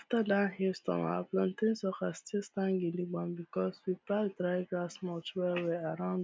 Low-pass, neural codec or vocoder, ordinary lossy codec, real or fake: none; none; none; real